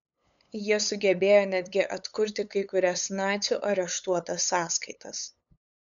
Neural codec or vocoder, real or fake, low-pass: codec, 16 kHz, 8 kbps, FunCodec, trained on LibriTTS, 25 frames a second; fake; 7.2 kHz